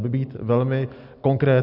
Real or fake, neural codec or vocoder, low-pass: real; none; 5.4 kHz